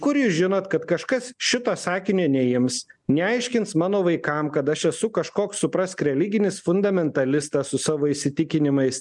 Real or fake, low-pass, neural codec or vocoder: real; 10.8 kHz; none